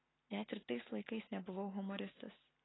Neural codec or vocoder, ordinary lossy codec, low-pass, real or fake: none; AAC, 16 kbps; 7.2 kHz; real